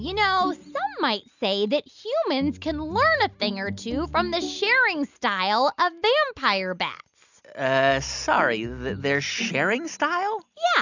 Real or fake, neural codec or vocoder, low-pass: real; none; 7.2 kHz